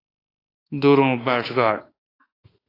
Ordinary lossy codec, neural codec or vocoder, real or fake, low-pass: AAC, 24 kbps; autoencoder, 48 kHz, 32 numbers a frame, DAC-VAE, trained on Japanese speech; fake; 5.4 kHz